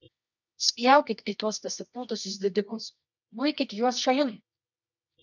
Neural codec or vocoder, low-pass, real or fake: codec, 24 kHz, 0.9 kbps, WavTokenizer, medium music audio release; 7.2 kHz; fake